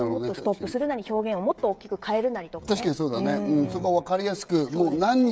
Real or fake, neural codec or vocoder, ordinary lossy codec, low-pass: fake; codec, 16 kHz, 16 kbps, FreqCodec, smaller model; none; none